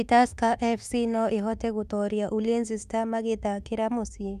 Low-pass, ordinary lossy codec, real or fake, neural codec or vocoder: 14.4 kHz; none; fake; autoencoder, 48 kHz, 32 numbers a frame, DAC-VAE, trained on Japanese speech